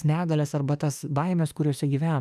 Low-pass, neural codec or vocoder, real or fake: 14.4 kHz; autoencoder, 48 kHz, 32 numbers a frame, DAC-VAE, trained on Japanese speech; fake